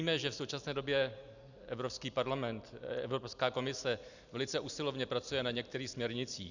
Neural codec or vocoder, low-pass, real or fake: none; 7.2 kHz; real